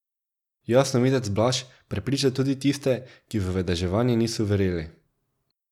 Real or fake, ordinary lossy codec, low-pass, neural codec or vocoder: real; none; 19.8 kHz; none